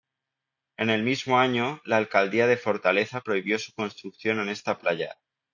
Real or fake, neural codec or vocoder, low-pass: real; none; 7.2 kHz